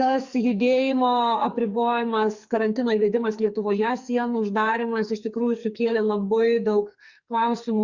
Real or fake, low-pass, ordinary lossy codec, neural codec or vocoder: fake; 7.2 kHz; Opus, 64 kbps; codec, 44.1 kHz, 2.6 kbps, SNAC